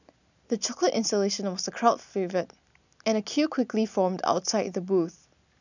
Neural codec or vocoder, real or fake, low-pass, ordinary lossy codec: none; real; 7.2 kHz; none